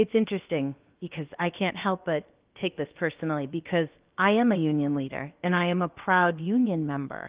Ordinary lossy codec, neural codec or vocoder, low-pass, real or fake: Opus, 32 kbps; codec, 16 kHz, about 1 kbps, DyCAST, with the encoder's durations; 3.6 kHz; fake